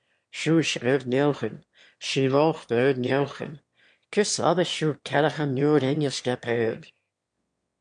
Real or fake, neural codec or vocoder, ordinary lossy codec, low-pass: fake; autoencoder, 22.05 kHz, a latent of 192 numbers a frame, VITS, trained on one speaker; MP3, 64 kbps; 9.9 kHz